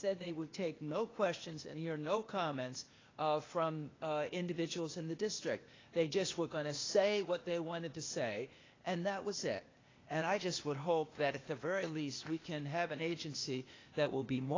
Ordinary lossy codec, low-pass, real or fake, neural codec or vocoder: AAC, 32 kbps; 7.2 kHz; fake; codec, 16 kHz, 0.8 kbps, ZipCodec